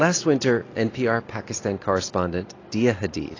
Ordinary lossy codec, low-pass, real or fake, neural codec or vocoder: AAC, 32 kbps; 7.2 kHz; real; none